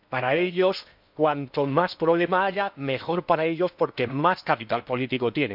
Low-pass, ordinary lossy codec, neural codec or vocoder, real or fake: 5.4 kHz; none; codec, 16 kHz in and 24 kHz out, 0.8 kbps, FocalCodec, streaming, 65536 codes; fake